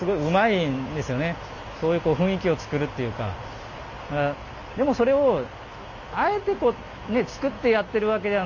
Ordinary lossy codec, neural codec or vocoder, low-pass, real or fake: none; none; 7.2 kHz; real